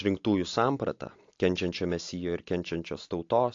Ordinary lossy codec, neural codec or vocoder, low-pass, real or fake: AAC, 48 kbps; none; 7.2 kHz; real